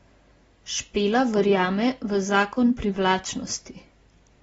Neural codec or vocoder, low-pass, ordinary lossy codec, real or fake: none; 10.8 kHz; AAC, 24 kbps; real